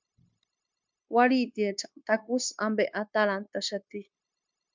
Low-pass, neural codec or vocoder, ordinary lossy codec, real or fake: 7.2 kHz; codec, 16 kHz, 0.9 kbps, LongCat-Audio-Codec; MP3, 64 kbps; fake